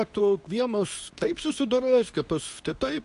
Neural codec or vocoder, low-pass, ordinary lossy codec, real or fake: codec, 24 kHz, 0.9 kbps, WavTokenizer, medium speech release version 2; 10.8 kHz; AAC, 64 kbps; fake